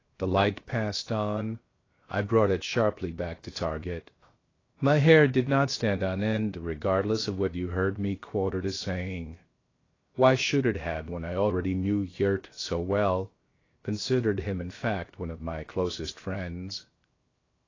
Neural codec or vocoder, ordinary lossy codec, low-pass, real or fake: codec, 16 kHz, 0.7 kbps, FocalCodec; AAC, 32 kbps; 7.2 kHz; fake